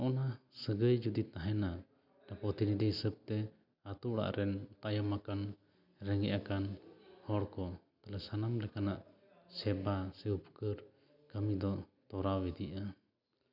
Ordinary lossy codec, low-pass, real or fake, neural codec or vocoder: none; 5.4 kHz; real; none